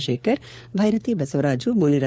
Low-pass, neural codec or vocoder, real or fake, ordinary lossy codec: none; codec, 16 kHz, 4 kbps, FunCodec, trained on LibriTTS, 50 frames a second; fake; none